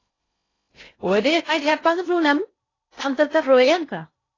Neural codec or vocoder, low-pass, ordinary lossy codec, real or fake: codec, 16 kHz in and 24 kHz out, 0.6 kbps, FocalCodec, streaming, 2048 codes; 7.2 kHz; AAC, 32 kbps; fake